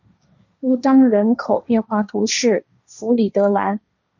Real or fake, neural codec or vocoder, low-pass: fake; codec, 16 kHz, 1.1 kbps, Voila-Tokenizer; 7.2 kHz